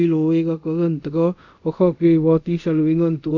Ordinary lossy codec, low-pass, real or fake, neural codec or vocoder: none; 7.2 kHz; fake; codec, 24 kHz, 0.5 kbps, DualCodec